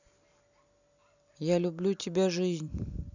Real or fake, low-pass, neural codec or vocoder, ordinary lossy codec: fake; 7.2 kHz; vocoder, 44.1 kHz, 128 mel bands every 256 samples, BigVGAN v2; none